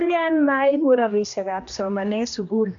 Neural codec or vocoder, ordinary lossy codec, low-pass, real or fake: codec, 16 kHz, 1 kbps, X-Codec, HuBERT features, trained on general audio; MP3, 64 kbps; 7.2 kHz; fake